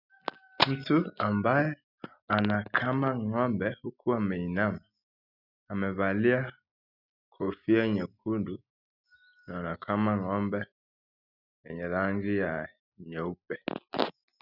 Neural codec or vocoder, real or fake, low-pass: none; real; 5.4 kHz